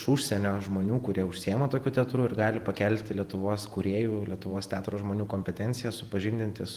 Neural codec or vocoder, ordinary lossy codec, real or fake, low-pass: vocoder, 48 kHz, 128 mel bands, Vocos; Opus, 24 kbps; fake; 14.4 kHz